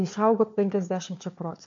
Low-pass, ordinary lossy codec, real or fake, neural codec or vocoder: 7.2 kHz; MP3, 48 kbps; fake; codec, 16 kHz, 4 kbps, FunCodec, trained on LibriTTS, 50 frames a second